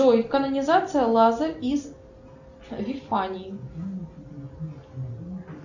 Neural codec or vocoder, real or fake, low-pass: none; real; 7.2 kHz